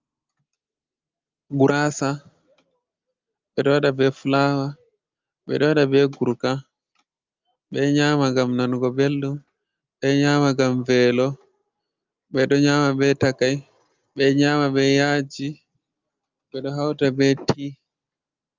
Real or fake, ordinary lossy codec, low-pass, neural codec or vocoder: real; Opus, 24 kbps; 7.2 kHz; none